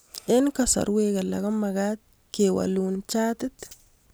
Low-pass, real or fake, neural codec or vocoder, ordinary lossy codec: none; real; none; none